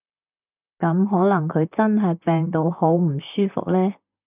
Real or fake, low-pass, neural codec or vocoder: fake; 3.6 kHz; vocoder, 44.1 kHz, 128 mel bands, Pupu-Vocoder